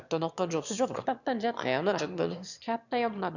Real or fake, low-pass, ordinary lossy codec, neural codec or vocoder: fake; 7.2 kHz; none; autoencoder, 22.05 kHz, a latent of 192 numbers a frame, VITS, trained on one speaker